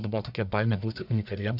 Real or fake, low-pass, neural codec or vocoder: fake; 5.4 kHz; codec, 44.1 kHz, 1.7 kbps, Pupu-Codec